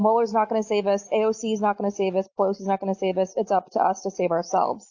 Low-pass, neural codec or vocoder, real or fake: 7.2 kHz; none; real